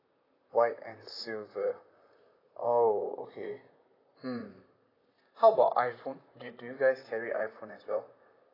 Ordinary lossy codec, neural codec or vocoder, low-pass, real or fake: AAC, 24 kbps; vocoder, 44.1 kHz, 128 mel bands, Pupu-Vocoder; 5.4 kHz; fake